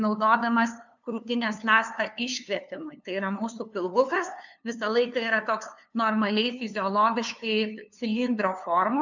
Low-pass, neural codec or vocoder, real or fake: 7.2 kHz; codec, 16 kHz, 2 kbps, FunCodec, trained on LibriTTS, 25 frames a second; fake